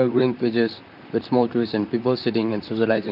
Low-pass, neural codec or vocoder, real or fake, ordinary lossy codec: 5.4 kHz; vocoder, 44.1 kHz, 128 mel bands, Pupu-Vocoder; fake; none